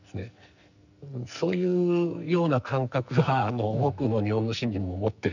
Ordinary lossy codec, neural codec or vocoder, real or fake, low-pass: none; codec, 44.1 kHz, 2.6 kbps, SNAC; fake; 7.2 kHz